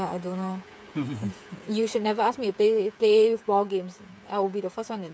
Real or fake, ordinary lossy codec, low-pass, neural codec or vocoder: fake; none; none; codec, 16 kHz, 8 kbps, FreqCodec, smaller model